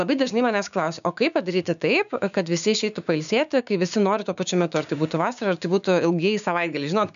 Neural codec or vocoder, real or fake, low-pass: codec, 16 kHz, 6 kbps, DAC; fake; 7.2 kHz